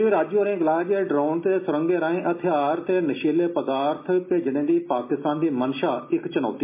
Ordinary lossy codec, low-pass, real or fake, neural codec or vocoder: MP3, 24 kbps; 3.6 kHz; real; none